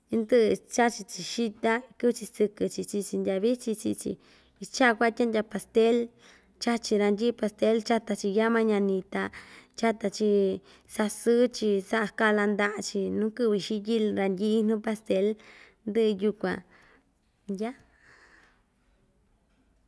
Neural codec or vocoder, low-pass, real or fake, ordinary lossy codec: none; none; real; none